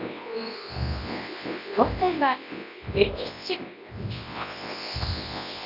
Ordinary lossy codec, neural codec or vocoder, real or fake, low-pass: none; codec, 24 kHz, 0.9 kbps, WavTokenizer, large speech release; fake; 5.4 kHz